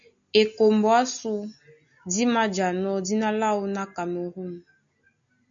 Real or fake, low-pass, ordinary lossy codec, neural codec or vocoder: real; 7.2 kHz; MP3, 64 kbps; none